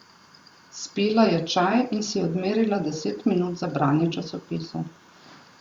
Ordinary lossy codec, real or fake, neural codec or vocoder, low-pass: Opus, 64 kbps; fake; vocoder, 44.1 kHz, 128 mel bands every 512 samples, BigVGAN v2; 19.8 kHz